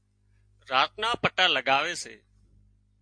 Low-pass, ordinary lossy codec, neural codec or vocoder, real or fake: 9.9 kHz; MP3, 48 kbps; none; real